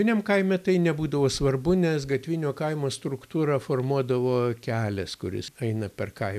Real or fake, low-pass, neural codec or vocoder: real; 14.4 kHz; none